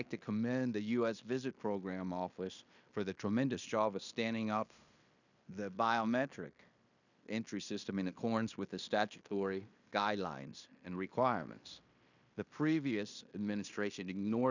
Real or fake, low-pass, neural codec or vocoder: fake; 7.2 kHz; codec, 16 kHz in and 24 kHz out, 0.9 kbps, LongCat-Audio-Codec, fine tuned four codebook decoder